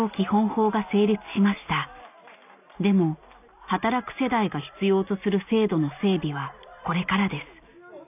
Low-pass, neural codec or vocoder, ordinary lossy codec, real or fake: 3.6 kHz; none; none; real